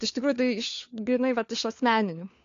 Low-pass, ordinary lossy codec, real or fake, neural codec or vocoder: 7.2 kHz; AAC, 48 kbps; fake; codec, 16 kHz, 4 kbps, FunCodec, trained on LibriTTS, 50 frames a second